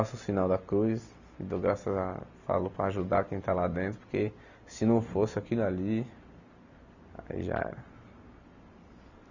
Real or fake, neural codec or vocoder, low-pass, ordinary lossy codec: real; none; 7.2 kHz; none